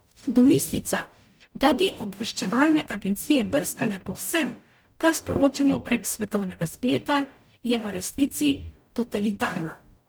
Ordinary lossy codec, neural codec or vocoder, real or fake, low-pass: none; codec, 44.1 kHz, 0.9 kbps, DAC; fake; none